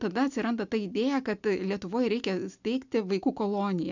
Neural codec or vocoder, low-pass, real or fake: none; 7.2 kHz; real